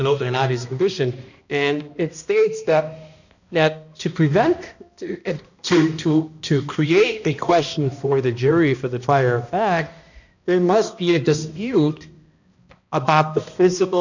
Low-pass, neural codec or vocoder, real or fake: 7.2 kHz; codec, 16 kHz, 1 kbps, X-Codec, HuBERT features, trained on balanced general audio; fake